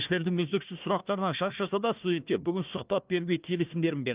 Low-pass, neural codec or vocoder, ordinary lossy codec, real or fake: 3.6 kHz; codec, 16 kHz, 1 kbps, FunCodec, trained on Chinese and English, 50 frames a second; Opus, 32 kbps; fake